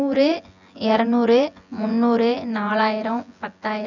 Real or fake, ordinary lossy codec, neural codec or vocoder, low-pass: fake; none; vocoder, 24 kHz, 100 mel bands, Vocos; 7.2 kHz